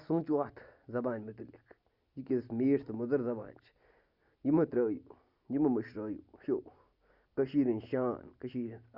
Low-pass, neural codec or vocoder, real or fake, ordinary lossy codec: 5.4 kHz; none; real; Opus, 64 kbps